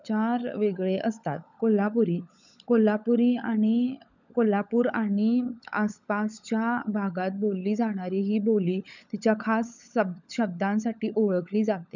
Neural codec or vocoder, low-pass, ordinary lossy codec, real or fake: codec, 16 kHz, 16 kbps, FunCodec, trained on LibriTTS, 50 frames a second; 7.2 kHz; none; fake